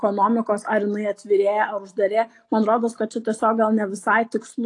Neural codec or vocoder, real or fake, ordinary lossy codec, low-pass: none; real; AAC, 48 kbps; 10.8 kHz